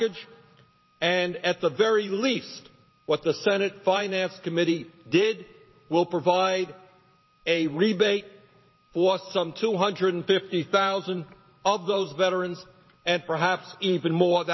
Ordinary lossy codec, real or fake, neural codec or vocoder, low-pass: MP3, 24 kbps; fake; vocoder, 44.1 kHz, 128 mel bands every 512 samples, BigVGAN v2; 7.2 kHz